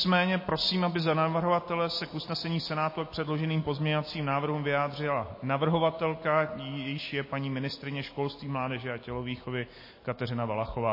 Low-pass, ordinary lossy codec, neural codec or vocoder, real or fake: 5.4 kHz; MP3, 24 kbps; none; real